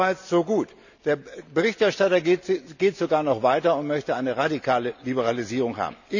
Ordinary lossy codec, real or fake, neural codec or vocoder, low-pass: none; real; none; 7.2 kHz